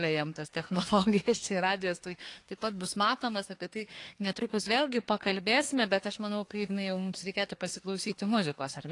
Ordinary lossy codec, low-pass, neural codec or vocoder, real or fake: AAC, 48 kbps; 10.8 kHz; codec, 24 kHz, 1 kbps, SNAC; fake